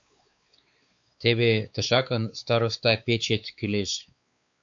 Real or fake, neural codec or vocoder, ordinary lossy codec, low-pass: fake; codec, 16 kHz, 4 kbps, X-Codec, WavLM features, trained on Multilingual LibriSpeech; AAC, 64 kbps; 7.2 kHz